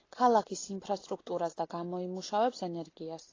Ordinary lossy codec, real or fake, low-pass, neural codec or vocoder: AAC, 32 kbps; real; 7.2 kHz; none